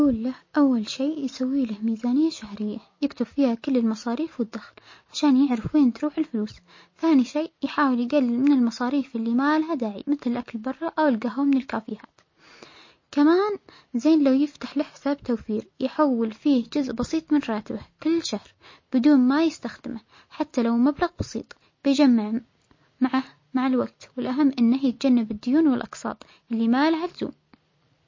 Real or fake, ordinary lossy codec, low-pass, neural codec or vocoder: real; MP3, 32 kbps; 7.2 kHz; none